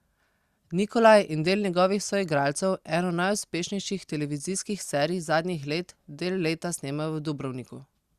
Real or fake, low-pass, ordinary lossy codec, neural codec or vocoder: real; 14.4 kHz; Opus, 64 kbps; none